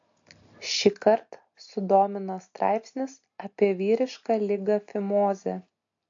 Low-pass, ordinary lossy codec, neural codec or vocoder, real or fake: 7.2 kHz; AAC, 48 kbps; none; real